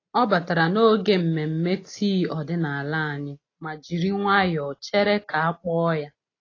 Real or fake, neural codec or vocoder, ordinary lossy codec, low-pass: real; none; AAC, 32 kbps; 7.2 kHz